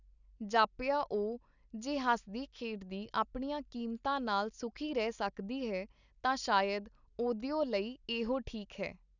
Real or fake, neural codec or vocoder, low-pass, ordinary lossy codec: real; none; 7.2 kHz; none